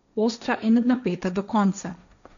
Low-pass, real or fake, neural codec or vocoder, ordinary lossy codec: 7.2 kHz; fake; codec, 16 kHz, 1.1 kbps, Voila-Tokenizer; none